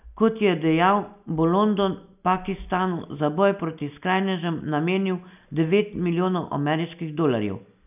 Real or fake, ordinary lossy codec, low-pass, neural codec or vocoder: real; none; 3.6 kHz; none